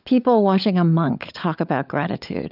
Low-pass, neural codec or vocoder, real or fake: 5.4 kHz; codec, 16 kHz, 16 kbps, FunCodec, trained on LibriTTS, 50 frames a second; fake